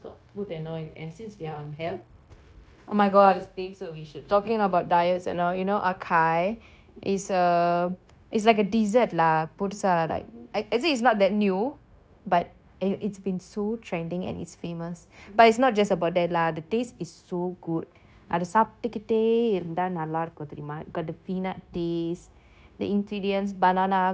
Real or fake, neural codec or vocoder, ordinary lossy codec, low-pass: fake; codec, 16 kHz, 0.9 kbps, LongCat-Audio-Codec; none; none